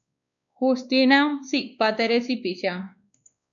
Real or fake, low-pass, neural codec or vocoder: fake; 7.2 kHz; codec, 16 kHz, 2 kbps, X-Codec, WavLM features, trained on Multilingual LibriSpeech